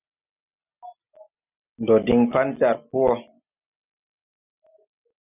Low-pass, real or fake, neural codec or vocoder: 3.6 kHz; real; none